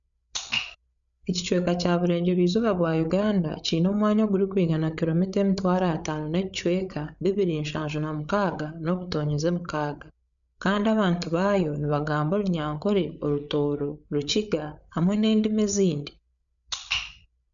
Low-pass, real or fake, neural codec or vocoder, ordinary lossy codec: 7.2 kHz; fake; codec, 16 kHz, 8 kbps, FreqCodec, larger model; none